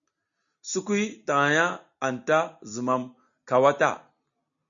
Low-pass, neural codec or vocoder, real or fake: 7.2 kHz; none; real